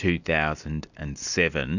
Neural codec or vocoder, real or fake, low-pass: none; real; 7.2 kHz